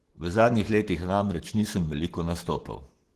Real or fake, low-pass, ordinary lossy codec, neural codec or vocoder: fake; 14.4 kHz; Opus, 16 kbps; codec, 44.1 kHz, 7.8 kbps, Pupu-Codec